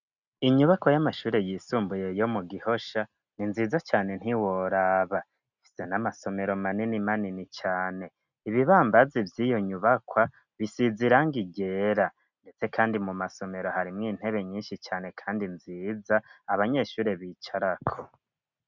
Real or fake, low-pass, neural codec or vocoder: real; 7.2 kHz; none